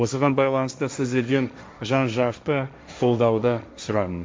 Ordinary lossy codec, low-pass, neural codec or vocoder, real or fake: none; none; codec, 16 kHz, 1.1 kbps, Voila-Tokenizer; fake